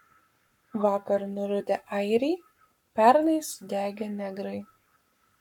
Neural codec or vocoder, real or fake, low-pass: codec, 44.1 kHz, 7.8 kbps, Pupu-Codec; fake; 19.8 kHz